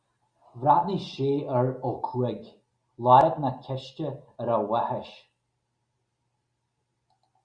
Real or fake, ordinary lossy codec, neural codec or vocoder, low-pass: real; Opus, 64 kbps; none; 9.9 kHz